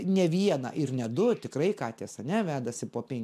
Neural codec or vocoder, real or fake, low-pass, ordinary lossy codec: none; real; 14.4 kHz; MP3, 96 kbps